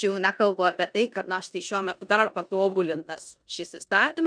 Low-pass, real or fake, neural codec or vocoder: 9.9 kHz; fake; codec, 16 kHz in and 24 kHz out, 0.9 kbps, LongCat-Audio-Codec, four codebook decoder